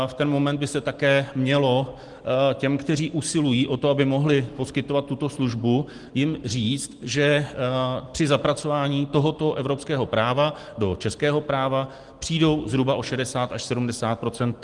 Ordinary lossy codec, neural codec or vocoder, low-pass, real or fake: Opus, 24 kbps; none; 10.8 kHz; real